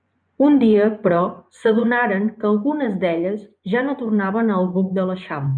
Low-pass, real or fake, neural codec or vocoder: 9.9 kHz; fake; vocoder, 24 kHz, 100 mel bands, Vocos